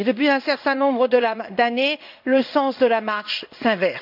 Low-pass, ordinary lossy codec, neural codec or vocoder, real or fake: 5.4 kHz; none; codec, 16 kHz in and 24 kHz out, 1 kbps, XY-Tokenizer; fake